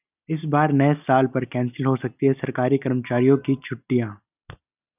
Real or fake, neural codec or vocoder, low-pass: real; none; 3.6 kHz